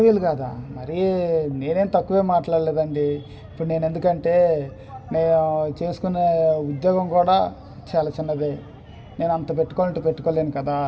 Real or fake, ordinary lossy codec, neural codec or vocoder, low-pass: real; none; none; none